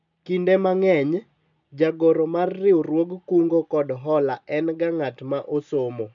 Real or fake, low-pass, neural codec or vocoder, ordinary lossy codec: real; 7.2 kHz; none; none